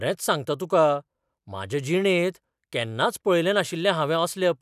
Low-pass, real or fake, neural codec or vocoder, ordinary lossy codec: 14.4 kHz; real; none; none